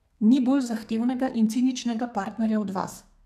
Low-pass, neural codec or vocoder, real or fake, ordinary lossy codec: 14.4 kHz; codec, 32 kHz, 1.9 kbps, SNAC; fake; none